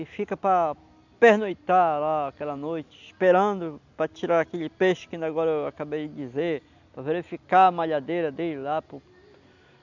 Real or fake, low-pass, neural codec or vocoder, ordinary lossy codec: real; 7.2 kHz; none; none